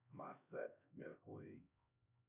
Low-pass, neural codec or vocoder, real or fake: 3.6 kHz; codec, 16 kHz, 1 kbps, X-Codec, HuBERT features, trained on LibriSpeech; fake